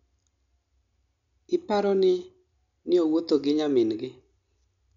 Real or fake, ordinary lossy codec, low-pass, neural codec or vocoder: real; none; 7.2 kHz; none